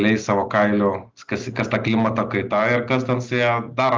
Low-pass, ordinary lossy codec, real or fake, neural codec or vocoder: 7.2 kHz; Opus, 32 kbps; real; none